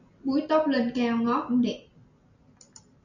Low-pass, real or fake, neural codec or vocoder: 7.2 kHz; real; none